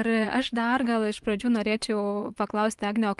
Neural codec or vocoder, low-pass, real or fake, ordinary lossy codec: vocoder, 24 kHz, 100 mel bands, Vocos; 10.8 kHz; fake; Opus, 32 kbps